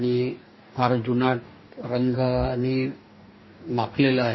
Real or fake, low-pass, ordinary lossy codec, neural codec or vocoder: fake; 7.2 kHz; MP3, 24 kbps; codec, 44.1 kHz, 2.6 kbps, DAC